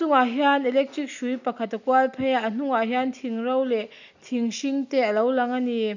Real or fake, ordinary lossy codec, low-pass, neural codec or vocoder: real; none; 7.2 kHz; none